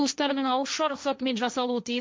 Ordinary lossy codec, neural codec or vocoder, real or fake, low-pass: none; codec, 16 kHz, 1.1 kbps, Voila-Tokenizer; fake; none